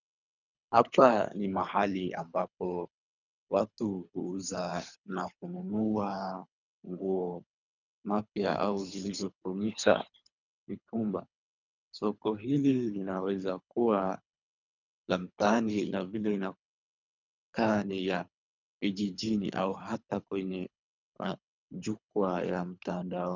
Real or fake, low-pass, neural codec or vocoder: fake; 7.2 kHz; codec, 24 kHz, 3 kbps, HILCodec